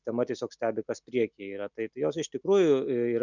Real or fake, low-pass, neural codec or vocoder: real; 7.2 kHz; none